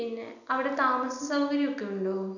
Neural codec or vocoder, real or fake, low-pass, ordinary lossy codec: none; real; 7.2 kHz; none